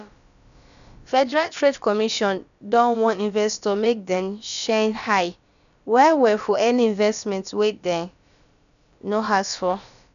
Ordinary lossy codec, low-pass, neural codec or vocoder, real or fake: none; 7.2 kHz; codec, 16 kHz, about 1 kbps, DyCAST, with the encoder's durations; fake